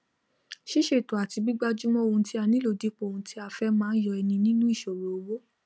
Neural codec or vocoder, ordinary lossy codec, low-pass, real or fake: none; none; none; real